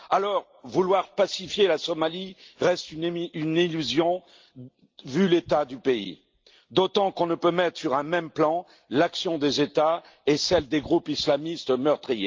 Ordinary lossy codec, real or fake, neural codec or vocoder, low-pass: Opus, 32 kbps; real; none; 7.2 kHz